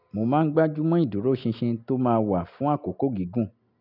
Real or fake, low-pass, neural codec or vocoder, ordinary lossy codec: real; 5.4 kHz; none; none